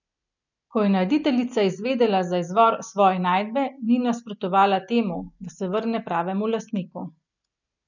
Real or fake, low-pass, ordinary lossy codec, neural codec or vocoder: real; 7.2 kHz; none; none